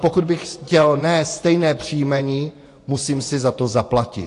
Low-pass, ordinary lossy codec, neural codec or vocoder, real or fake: 10.8 kHz; AAC, 48 kbps; vocoder, 24 kHz, 100 mel bands, Vocos; fake